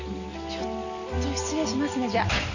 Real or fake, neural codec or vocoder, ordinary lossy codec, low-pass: real; none; none; 7.2 kHz